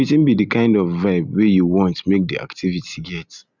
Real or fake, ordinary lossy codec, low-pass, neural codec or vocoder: real; none; 7.2 kHz; none